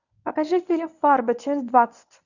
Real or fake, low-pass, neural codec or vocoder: fake; 7.2 kHz; codec, 24 kHz, 0.9 kbps, WavTokenizer, medium speech release version 1